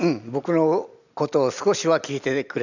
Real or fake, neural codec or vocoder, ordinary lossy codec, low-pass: real; none; none; 7.2 kHz